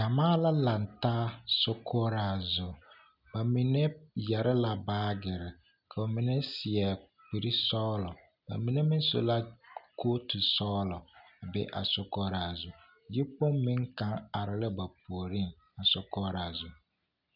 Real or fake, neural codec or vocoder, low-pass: real; none; 5.4 kHz